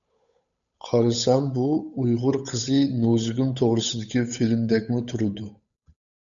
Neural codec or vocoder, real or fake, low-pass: codec, 16 kHz, 8 kbps, FunCodec, trained on Chinese and English, 25 frames a second; fake; 7.2 kHz